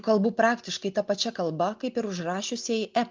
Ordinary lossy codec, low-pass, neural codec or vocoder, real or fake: Opus, 32 kbps; 7.2 kHz; none; real